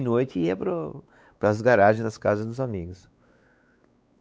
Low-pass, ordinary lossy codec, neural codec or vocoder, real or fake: none; none; codec, 16 kHz, 4 kbps, X-Codec, WavLM features, trained on Multilingual LibriSpeech; fake